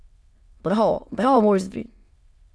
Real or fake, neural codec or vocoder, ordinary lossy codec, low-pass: fake; autoencoder, 22.05 kHz, a latent of 192 numbers a frame, VITS, trained on many speakers; none; none